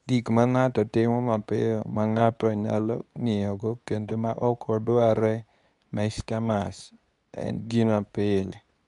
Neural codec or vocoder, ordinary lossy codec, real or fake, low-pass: codec, 24 kHz, 0.9 kbps, WavTokenizer, medium speech release version 2; none; fake; 10.8 kHz